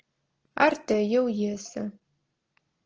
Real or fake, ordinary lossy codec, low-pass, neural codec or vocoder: real; Opus, 16 kbps; 7.2 kHz; none